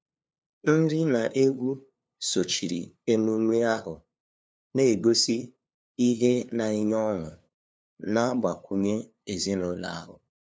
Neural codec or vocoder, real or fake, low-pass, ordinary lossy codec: codec, 16 kHz, 2 kbps, FunCodec, trained on LibriTTS, 25 frames a second; fake; none; none